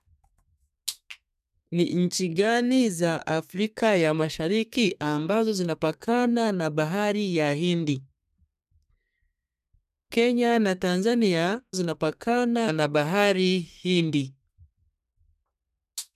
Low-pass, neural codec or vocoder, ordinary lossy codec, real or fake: 14.4 kHz; codec, 32 kHz, 1.9 kbps, SNAC; none; fake